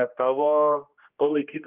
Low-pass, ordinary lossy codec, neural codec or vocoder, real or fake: 3.6 kHz; Opus, 32 kbps; codec, 16 kHz, 1 kbps, X-Codec, HuBERT features, trained on general audio; fake